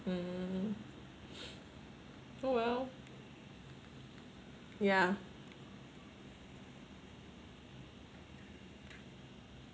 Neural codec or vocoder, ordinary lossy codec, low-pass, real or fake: none; none; none; real